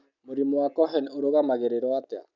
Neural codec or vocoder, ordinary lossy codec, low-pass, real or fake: none; none; 7.2 kHz; real